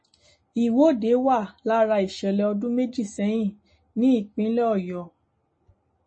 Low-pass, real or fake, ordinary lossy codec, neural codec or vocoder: 9.9 kHz; real; MP3, 32 kbps; none